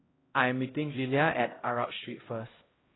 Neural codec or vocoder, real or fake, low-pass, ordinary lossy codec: codec, 16 kHz, 0.5 kbps, X-Codec, HuBERT features, trained on LibriSpeech; fake; 7.2 kHz; AAC, 16 kbps